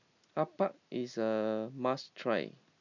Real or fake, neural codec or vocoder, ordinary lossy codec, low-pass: real; none; none; 7.2 kHz